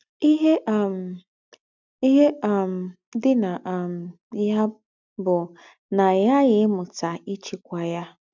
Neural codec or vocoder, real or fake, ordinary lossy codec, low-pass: none; real; none; 7.2 kHz